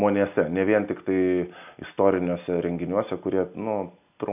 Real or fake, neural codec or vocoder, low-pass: real; none; 3.6 kHz